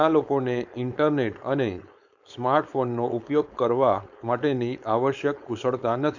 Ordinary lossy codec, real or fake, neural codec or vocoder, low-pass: none; fake; codec, 16 kHz, 4.8 kbps, FACodec; 7.2 kHz